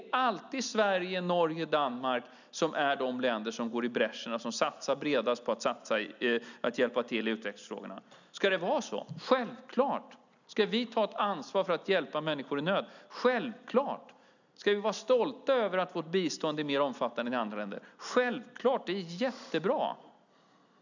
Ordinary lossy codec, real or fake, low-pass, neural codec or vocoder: none; real; 7.2 kHz; none